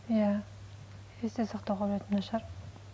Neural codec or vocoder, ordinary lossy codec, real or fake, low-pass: none; none; real; none